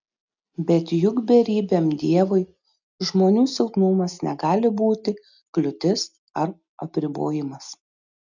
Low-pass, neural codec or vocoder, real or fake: 7.2 kHz; none; real